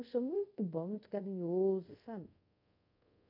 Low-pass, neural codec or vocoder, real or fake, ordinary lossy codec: 5.4 kHz; codec, 24 kHz, 0.5 kbps, DualCodec; fake; none